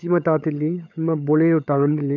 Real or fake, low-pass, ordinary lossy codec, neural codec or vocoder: fake; 7.2 kHz; none; codec, 16 kHz, 16 kbps, FunCodec, trained on LibriTTS, 50 frames a second